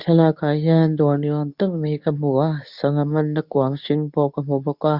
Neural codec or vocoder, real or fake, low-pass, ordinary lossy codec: codec, 24 kHz, 0.9 kbps, WavTokenizer, medium speech release version 2; fake; 5.4 kHz; none